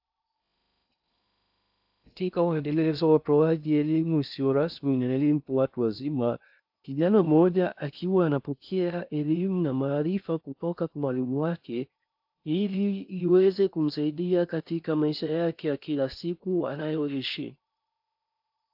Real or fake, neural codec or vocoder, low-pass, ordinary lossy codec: fake; codec, 16 kHz in and 24 kHz out, 0.6 kbps, FocalCodec, streaming, 2048 codes; 5.4 kHz; AAC, 48 kbps